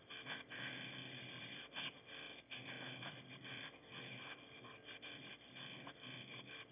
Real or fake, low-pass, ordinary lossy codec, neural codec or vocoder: fake; 3.6 kHz; none; autoencoder, 22.05 kHz, a latent of 192 numbers a frame, VITS, trained on one speaker